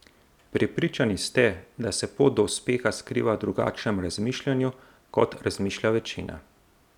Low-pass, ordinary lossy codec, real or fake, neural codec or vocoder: 19.8 kHz; none; real; none